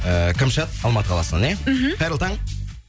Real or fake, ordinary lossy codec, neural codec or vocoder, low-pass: real; none; none; none